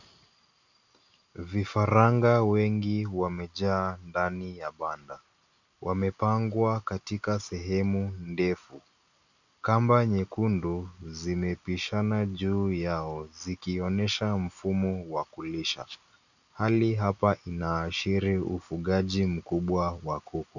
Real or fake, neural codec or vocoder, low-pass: real; none; 7.2 kHz